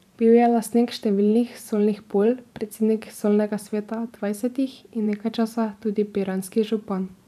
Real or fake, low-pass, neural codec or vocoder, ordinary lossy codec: fake; 14.4 kHz; vocoder, 44.1 kHz, 128 mel bands every 512 samples, BigVGAN v2; none